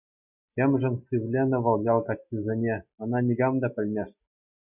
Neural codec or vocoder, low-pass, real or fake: none; 3.6 kHz; real